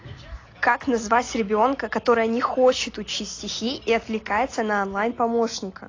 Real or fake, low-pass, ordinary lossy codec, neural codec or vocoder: real; 7.2 kHz; AAC, 32 kbps; none